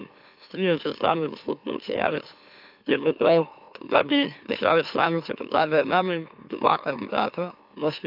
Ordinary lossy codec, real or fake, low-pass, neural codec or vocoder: AAC, 48 kbps; fake; 5.4 kHz; autoencoder, 44.1 kHz, a latent of 192 numbers a frame, MeloTTS